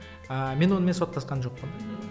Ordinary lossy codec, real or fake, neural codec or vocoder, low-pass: none; real; none; none